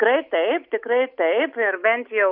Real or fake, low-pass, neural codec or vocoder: real; 5.4 kHz; none